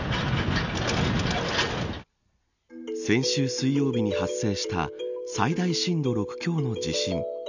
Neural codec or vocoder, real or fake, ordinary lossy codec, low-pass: none; real; none; 7.2 kHz